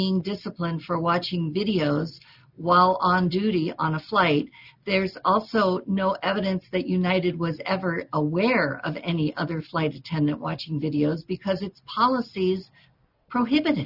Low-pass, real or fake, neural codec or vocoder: 5.4 kHz; real; none